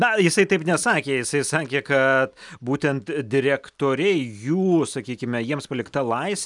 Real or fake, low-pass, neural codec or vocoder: real; 10.8 kHz; none